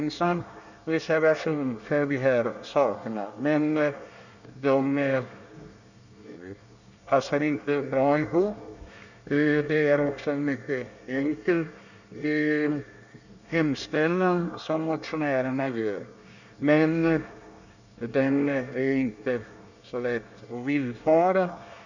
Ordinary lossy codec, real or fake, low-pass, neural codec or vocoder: none; fake; 7.2 kHz; codec, 24 kHz, 1 kbps, SNAC